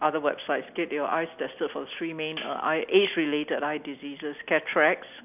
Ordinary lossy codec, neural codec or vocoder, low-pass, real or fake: none; none; 3.6 kHz; real